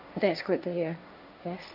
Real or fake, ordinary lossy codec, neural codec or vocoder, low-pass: fake; none; codec, 16 kHz, 1.1 kbps, Voila-Tokenizer; 5.4 kHz